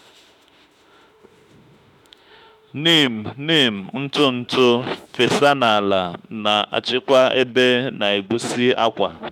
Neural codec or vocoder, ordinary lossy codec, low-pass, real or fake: autoencoder, 48 kHz, 32 numbers a frame, DAC-VAE, trained on Japanese speech; none; 19.8 kHz; fake